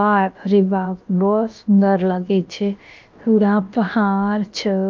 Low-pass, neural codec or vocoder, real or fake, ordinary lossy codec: 7.2 kHz; codec, 16 kHz, about 1 kbps, DyCAST, with the encoder's durations; fake; Opus, 24 kbps